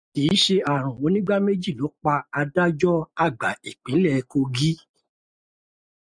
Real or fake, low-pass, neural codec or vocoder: real; 9.9 kHz; none